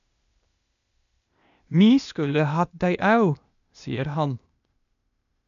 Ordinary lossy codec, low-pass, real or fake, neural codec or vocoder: none; 7.2 kHz; fake; codec, 16 kHz, 0.8 kbps, ZipCodec